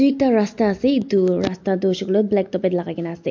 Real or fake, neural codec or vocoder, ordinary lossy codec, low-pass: real; none; MP3, 48 kbps; 7.2 kHz